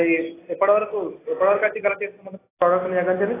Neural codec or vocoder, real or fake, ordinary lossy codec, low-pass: none; real; AAC, 16 kbps; 3.6 kHz